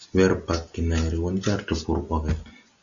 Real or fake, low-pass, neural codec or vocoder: real; 7.2 kHz; none